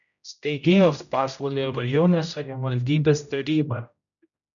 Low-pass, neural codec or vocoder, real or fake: 7.2 kHz; codec, 16 kHz, 0.5 kbps, X-Codec, HuBERT features, trained on general audio; fake